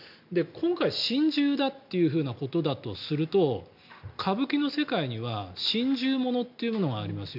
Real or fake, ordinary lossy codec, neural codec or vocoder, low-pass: real; MP3, 48 kbps; none; 5.4 kHz